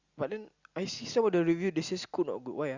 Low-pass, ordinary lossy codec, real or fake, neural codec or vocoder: 7.2 kHz; Opus, 64 kbps; real; none